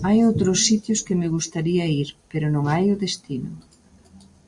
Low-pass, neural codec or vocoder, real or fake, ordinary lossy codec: 10.8 kHz; none; real; Opus, 64 kbps